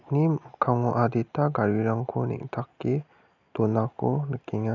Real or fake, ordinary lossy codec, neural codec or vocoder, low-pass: real; none; none; 7.2 kHz